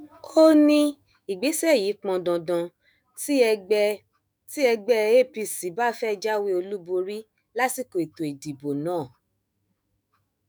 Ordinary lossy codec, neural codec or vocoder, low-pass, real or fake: none; autoencoder, 48 kHz, 128 numbers a frame, DAC-VAE, trained on Japanese speech; none; fake